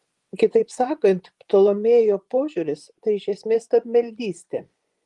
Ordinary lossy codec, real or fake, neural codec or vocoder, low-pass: Opus, 24 kbps; fake; vocoder, 24 kHz, 100 mel bands, Vocos; 10.8 kHz